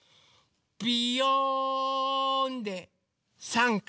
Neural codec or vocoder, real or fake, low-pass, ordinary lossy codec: none; real; none; none